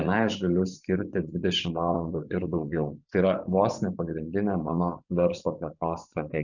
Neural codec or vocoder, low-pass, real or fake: vocoder, 24 kHz, 100 mel bands, Vocos; 7.2 kHz; fake